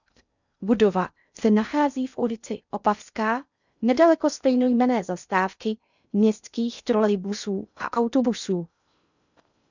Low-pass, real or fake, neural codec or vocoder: 7.2 kHz; fake; codec, 16 kHz in and 24 kHz out, 0.6 kbps, FocalCodec, streaming, 2048 codes